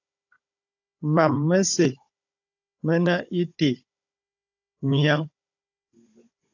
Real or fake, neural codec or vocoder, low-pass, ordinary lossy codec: fake; codec, 16 kHz, 16 kbps, FunCodec, trained on Chinese and English, 50 frames a second; 7.2 kHz; AAC, 48 kbps